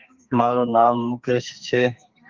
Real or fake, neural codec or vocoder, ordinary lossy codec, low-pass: fake; codec, 32 kHz, 1.9 kbps, SNAC; Opus, 32 kbps; 7.2 kHz